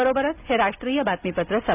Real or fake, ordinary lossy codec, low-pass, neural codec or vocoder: real; none; 3.6 kHz; none